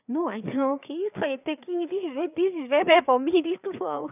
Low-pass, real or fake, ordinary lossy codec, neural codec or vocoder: 3.6 kHz; fake; none; codec, 16 kHz, 4 kbps, FreqCodec, larger model